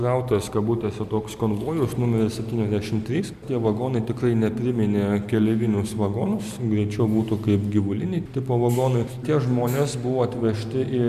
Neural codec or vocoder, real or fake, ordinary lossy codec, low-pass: none; real; MP3, 96 kbps; 14.4 kHz